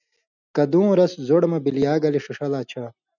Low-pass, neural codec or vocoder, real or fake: 7.2 kHz; none; real